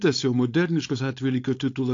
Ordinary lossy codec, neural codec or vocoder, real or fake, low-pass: AAC, 48 kbps; codec, 16 kHz, 4.8 kbps, FACodec; fake; 7.2 kHz